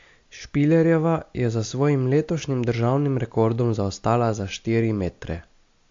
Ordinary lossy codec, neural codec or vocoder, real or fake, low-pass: AAC, 48 kbps; none; real; 7.2 kHz